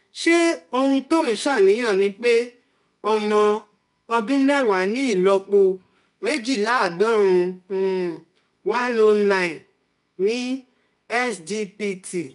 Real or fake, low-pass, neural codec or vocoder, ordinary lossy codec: fake; 10.8 kHz; codec, 24 kHz, 0.9 kbps, WavTokenizer, medium music audio release; none